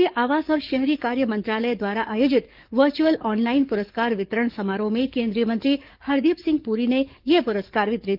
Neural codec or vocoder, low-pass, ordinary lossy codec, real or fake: codec, 44.1 kHz, 7.8 kbps, DAC; 5.4 kHz; Opus, 32 kbps; fake